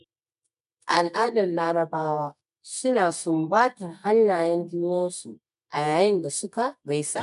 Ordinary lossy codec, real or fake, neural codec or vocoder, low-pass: none; fake; codec, 24 kHz, 0.9 kbps, WavTokenizer, medium music audio release; 10.8 kHz